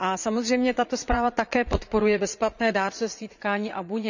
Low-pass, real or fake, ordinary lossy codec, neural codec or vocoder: 7.2 kHz; fake; none; vocoder, 44.1 kHz, 80 mel bands, Vocos